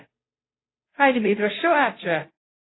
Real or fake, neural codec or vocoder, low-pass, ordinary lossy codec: fake; codec, 16 kHz, 0.5 kbps, FunCodec, trained on Chinese and English, 25 frames a second; 7.2 kHz; AAC, 16 kbps